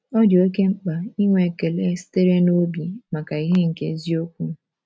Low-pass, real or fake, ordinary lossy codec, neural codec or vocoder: none; real; none; none